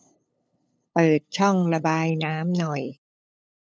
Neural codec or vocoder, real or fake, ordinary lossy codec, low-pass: codec, 16 kHz, 8 kbps, FunCodec, trained on LibriTTS, 25 frames a second; fake; none; none